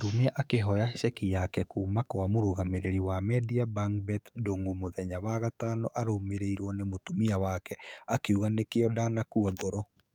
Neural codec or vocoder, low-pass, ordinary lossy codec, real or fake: codec, 44.1 kHz, 7.8 kbps, DAC; 19.8 kHz; none; fake